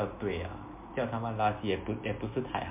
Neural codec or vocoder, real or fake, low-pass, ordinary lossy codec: none; real; 3.6 kHz; MP3, 32 kbps